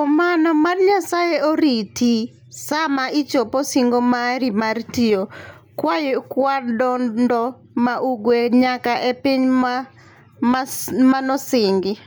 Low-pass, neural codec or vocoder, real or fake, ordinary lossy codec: none; none; real; none